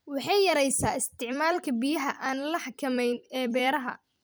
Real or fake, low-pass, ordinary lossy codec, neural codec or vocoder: fake; none; none; vocoder, 44.1 kHz, 128 mel bands every 256 samples, BigVGAN v2